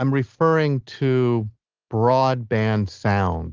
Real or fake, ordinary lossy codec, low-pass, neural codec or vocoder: real; Opus, 16 kbps; 7.2 kHz; none